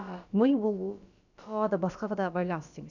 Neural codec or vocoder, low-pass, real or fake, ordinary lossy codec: codec, 16 kHz, about 1 kbps, DyCAST, with the encoder's durations; 7.2 kHz; fake; none